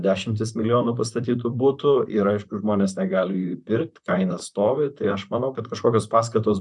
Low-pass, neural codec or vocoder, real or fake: 10.8 kHz; vocoder, 44.1 kHz, 128 mel bands, Pupu-Vocoder; fake